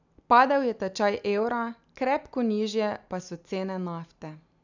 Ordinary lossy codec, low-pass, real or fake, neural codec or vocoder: none; 7.2 kHz; real; none